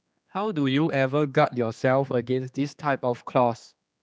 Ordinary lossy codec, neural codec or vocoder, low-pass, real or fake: none; codec, 16 kHz, 2 kbps, X-Codec, HuBERT features, trained on general audio; none; fake